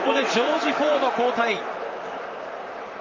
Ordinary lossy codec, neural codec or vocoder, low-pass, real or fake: Opus, 32 kbps; vocoder, 44.1 kHz, 128 mel bands, Pupu-Vocoder; 7.2 kHz; fake